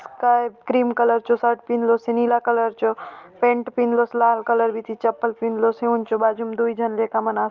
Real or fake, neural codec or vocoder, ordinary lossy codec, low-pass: real; none; Opus, 32 kbps; 7.2 kHz